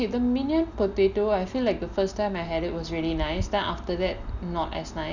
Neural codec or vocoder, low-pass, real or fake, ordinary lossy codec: none; 7.2 kHz; real; none